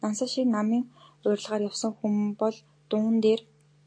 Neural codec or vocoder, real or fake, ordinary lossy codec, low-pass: none; real; AAC, 48 kbps; 9.9 kHz